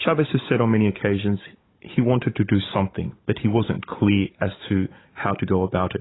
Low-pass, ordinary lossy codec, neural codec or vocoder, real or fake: 7.2 kHz; AAC, 16 kbps; codec, 16 kHz, 16 kbps, FunCodec, trained on Chinese and English, 50 frames a second; fake